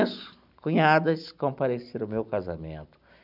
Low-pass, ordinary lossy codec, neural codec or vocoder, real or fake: 5.4 kHz; none; none; real